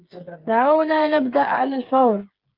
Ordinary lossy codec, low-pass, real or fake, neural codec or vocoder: Opus, 24 kbps; 5.4 kHz; fake; codec, 16 kHz, 8 kbps, FreqCodec, smaller model